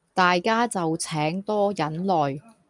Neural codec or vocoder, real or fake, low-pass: none; real; 10.8 kHz